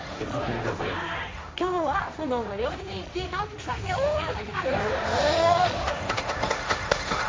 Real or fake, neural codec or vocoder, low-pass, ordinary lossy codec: fake; codec, 16 kHz, 1.1 kbps, Voila-Tokenizer; none; none